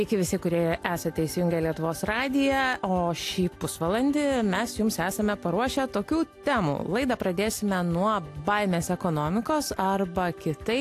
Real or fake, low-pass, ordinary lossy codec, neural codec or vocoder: real; 14.4 kHz; AAC, 64 kbps; none